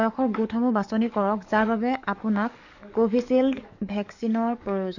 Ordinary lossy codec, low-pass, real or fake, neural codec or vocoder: none; 7.2 kHz; fake; codec, 44.1 kHz, 7.8 kbps, DAC